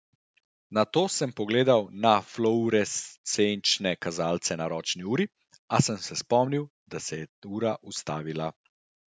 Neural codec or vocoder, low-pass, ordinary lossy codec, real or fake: none; none; none; real